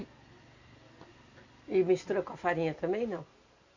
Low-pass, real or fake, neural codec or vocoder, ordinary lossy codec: 7.2 kHz; real; none; Opus, 64 kbps